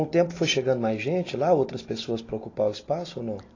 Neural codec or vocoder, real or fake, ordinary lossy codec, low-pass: none; real; AAC, 32 kbps; 7.2 kHz